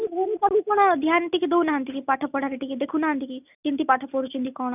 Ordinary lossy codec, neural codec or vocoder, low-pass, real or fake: none; none; 3.6 kHz; real